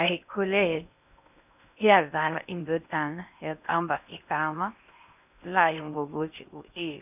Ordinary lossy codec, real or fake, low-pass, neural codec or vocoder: none; fake; 3.6 kHz; codec, 16 kHz in and 24 kHz out, 0.6 kbps, FocalCodec, streaming, 4096 codes